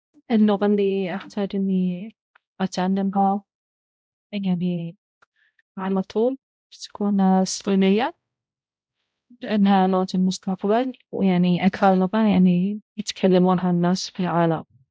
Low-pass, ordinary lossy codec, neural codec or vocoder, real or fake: none; none; codec, 16 kHz, 0.5 kbps, X-Codec, HuBERT features, trained on balanced general audio; fake